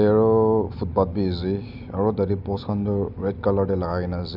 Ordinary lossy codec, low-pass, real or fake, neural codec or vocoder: none; 5.4 kHz; real; none